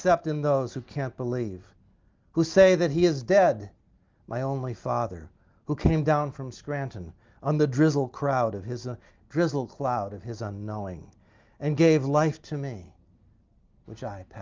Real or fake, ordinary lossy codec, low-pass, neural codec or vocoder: real; Opus, 32 kbps; 7.2 kHz; none